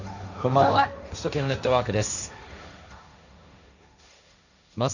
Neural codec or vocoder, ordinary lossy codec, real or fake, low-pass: codec, 16 kHz, 1.1 kbps, Voila-Tokenizer; none; fake; 7.2 kHz